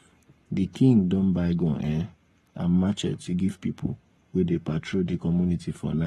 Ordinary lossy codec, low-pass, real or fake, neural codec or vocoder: AAC, 32 kbps; 19.8 kHz; fake; codec, 44.1 kHz, 7.8 kbps, Pupu-Codec